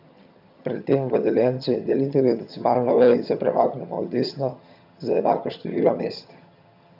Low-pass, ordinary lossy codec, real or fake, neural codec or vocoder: 5.4 kHz; none; fake; vocoder, 22.05 kHz, 80 mel bands, HiFi-GAN